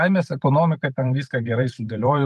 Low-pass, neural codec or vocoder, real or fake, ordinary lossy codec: 14.4 kHz; vocoder, 44.1 kHz, 128 mel bands every 512 samples, BigVGAN v2; fake; Opus, 32 kbps